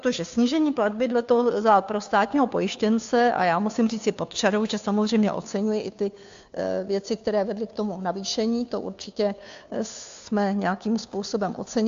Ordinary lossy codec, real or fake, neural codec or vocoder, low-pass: AAC, 64 kbps; fake; codec, 16 kHz, 2 kbps, FunCodec, trained on Chinese and English, 25 frames a second; 7.2 kHz